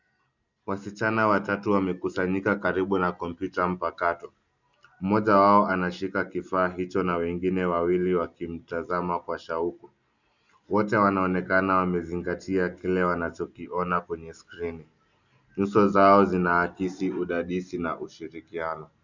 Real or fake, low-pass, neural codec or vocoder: real; 7.2 kHz; none